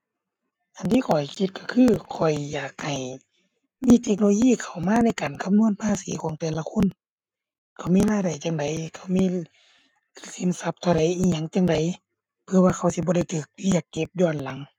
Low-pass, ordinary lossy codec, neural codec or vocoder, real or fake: 19.8 kHz; none; codec, 44.1 kHz, 7.8 kbps, Pupu-Codec; fake